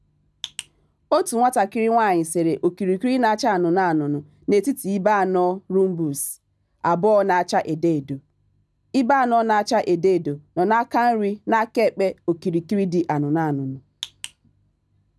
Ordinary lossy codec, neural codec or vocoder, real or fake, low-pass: none; none; real; none